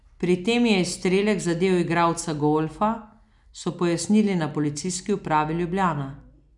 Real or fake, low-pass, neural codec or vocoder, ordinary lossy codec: real; 10.8 kHz; none; none